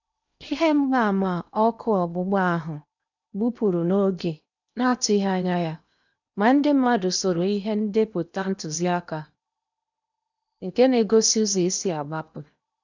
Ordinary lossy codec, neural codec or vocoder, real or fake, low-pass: none; codec, 16 kHz in and 24 kHz out, 0.8 kbps, FocalCodec, streaming, 65536 codes; fake; 7.2 kHz